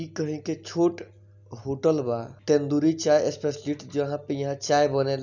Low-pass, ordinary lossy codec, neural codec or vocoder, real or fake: 7.2 kHz; none; none; real